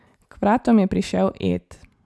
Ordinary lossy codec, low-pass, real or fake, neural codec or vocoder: none; none; real; none